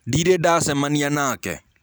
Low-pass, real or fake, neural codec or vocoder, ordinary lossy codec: none; fake; vocoder, 44.1 kHz, 128 mel bands every 256 samples, BigVGAN v2; none